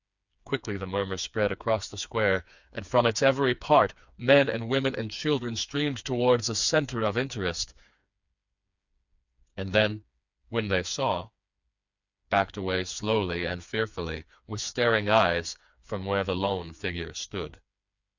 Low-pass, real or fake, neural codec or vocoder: 7.2 kHz; fake; codec, 16 kHz, 4 kbps, FreqCodec, smaller model